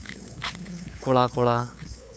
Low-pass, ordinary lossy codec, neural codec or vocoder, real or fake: none; none; codec, 16 kHz, 8 kbps, FunCodec, trained on LibriTTS, 25 frames a second; fake